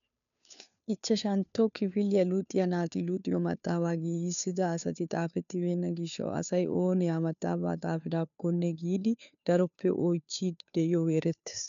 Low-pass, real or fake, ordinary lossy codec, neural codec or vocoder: 7.2 kHz; fake; MP3, 96 kbps; codec, 16 kHz, 2 kbps, FunCodec, trained on Chinese and English, 25 frames a second